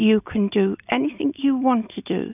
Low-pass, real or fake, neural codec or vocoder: 3.6 kHz; real; none